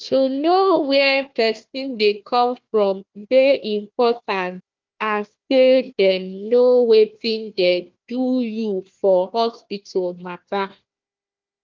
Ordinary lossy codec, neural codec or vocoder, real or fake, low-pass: Opus, 24 kbps; codec, 16 kHz, 1 kbps, FunCodec, trained on Chinese and English, 50 frames a second; fake; 7.2 kHz